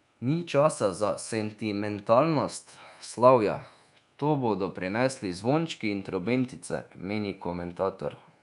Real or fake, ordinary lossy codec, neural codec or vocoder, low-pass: fake; none; codec, 24 kHz, 1.2 kbps, DualCodec; 10.8 kHz